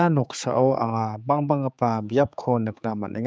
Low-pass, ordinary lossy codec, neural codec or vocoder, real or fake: none; none; codec, 16 kHz, 4 kbps, X-Codec, HuBERT features, trained on general audio; fake